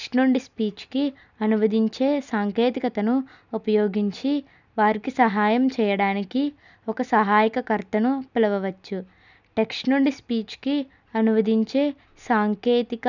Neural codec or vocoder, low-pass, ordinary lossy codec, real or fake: none; 7.2 kHz; none; real